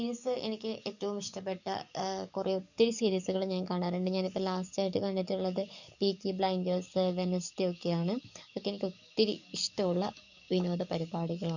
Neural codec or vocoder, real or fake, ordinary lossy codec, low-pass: codec, 44.1 kHz, 7.8 kbps, DAC; fake; none; 7.2 kHz